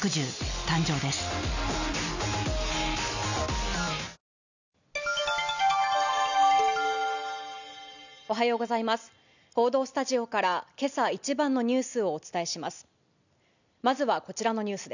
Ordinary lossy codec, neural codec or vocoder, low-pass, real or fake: none; none; 7.2 kHz; real